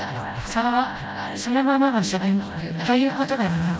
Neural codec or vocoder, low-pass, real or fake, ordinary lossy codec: codec, 16 kHz, 0.5 kbps, FreqCodec, smaller model; none; fake; none